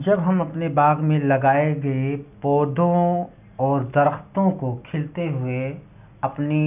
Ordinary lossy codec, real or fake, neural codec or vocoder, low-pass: none; real; none; 3.6 kHz